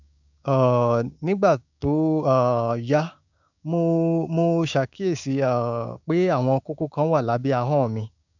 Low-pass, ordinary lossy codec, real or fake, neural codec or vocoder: 7.2 kHz; none; fake; codec, 16 kHz, 6 kbps, DAC